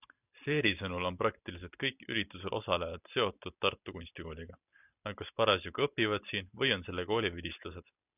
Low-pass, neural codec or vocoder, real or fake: 3.6 kHz; none; real